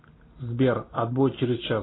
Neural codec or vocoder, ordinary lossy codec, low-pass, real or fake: none; AAC, 16 kbps; 7.2 kHz; real